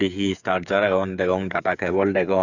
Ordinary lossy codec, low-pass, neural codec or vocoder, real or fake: none; 7.2 kHz; codec, 16 kHz, 8 kbps, FreqCodec, smaller model; fake